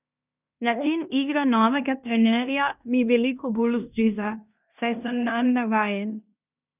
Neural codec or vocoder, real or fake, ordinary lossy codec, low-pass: codec, 16 kHz in and 24 kHz out, 0.9 kbps, LongCat-Audio-Codec, fine tuned four codebook decoder; fake; none; 3.6 kHz